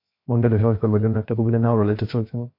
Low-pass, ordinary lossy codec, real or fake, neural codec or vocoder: 5.4 kHz; MP3, 32 kbps; fake; codec, 16 kHz, 0.7 kbps, FocalCodec